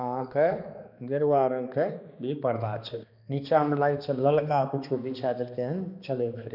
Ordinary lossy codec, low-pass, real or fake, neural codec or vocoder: none; 5.4 kHz; fake; codec, 16 kHz, 2 kbps, X-Codec, HuBERT features, trained on balanced general audio